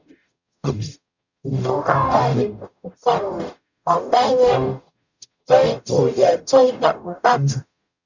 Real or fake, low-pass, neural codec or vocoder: fake; 7.2 kHz; codec, 44.1 kHz, 0.9 kbps, DAC